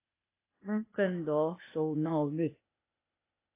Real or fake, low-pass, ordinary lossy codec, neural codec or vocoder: fake; 3.6 kHz; AAC, 24 kbps; codec, 16 kHz, 0.8 kbps, ZipCodec